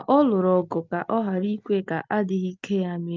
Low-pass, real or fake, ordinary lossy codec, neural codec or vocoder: 7.2 kHz; real; Opus, 24 kbps; none